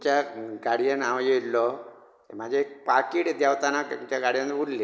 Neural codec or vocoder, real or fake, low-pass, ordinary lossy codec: none; real; none; none